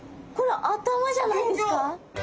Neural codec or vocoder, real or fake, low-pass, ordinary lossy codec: none; real; none; none